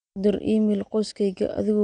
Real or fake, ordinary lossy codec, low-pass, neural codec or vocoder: real; none; 9.9 kHz; none